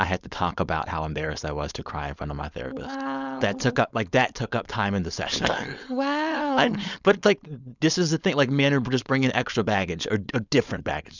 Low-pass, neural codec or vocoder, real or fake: 7.2 kHz; codec, 16 kHz, 4.8 kbps, FACodec; fake